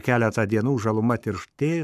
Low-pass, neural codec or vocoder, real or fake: 14.4 kHz; codec, 44.1 kHz, 7.8 kbps, Pupu-Codec; fake